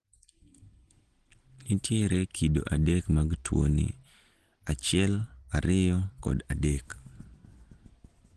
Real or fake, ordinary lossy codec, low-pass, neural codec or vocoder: real; Opus, 16 kbps; 10.8 kHz; none